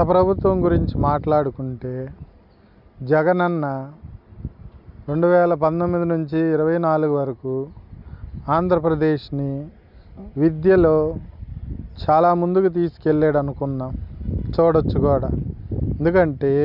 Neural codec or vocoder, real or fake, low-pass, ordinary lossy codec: none; real; 5.4 kHz; none